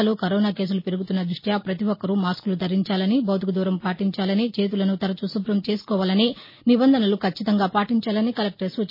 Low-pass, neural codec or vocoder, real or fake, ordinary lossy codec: 5.4 kHz; none; real; MP3, 24 kbps